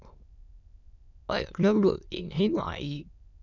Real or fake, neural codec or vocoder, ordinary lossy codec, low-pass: fake; autoencoder, 22.05 kHz, a latent of 192 numbers a frame, VITS, trained on many speakers; none; 7.2 kHz